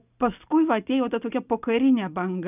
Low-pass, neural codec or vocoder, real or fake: 3.6 kHz; none; real